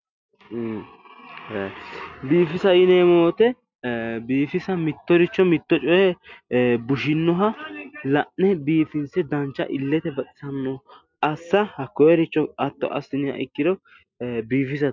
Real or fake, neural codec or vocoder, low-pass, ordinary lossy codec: real; none; 7.2 kHz; MP3, 64 kbps